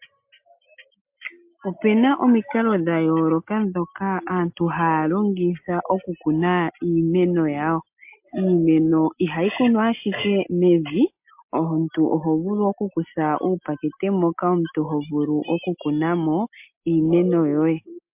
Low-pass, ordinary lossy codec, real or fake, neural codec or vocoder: 3.6 kHz; MP3, 32 kbps; real; none